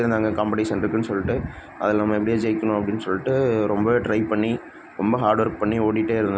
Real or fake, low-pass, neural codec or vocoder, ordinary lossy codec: real; none; none; none